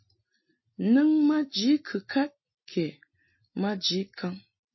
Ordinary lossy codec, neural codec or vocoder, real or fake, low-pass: MP3, 24 kbps; none; real; 7.2 kHz